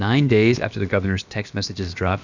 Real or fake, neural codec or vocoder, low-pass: fake; codec, 16 kHz, about 1 kbps, DyCAST, with the encoder's durations; 7.2 kHz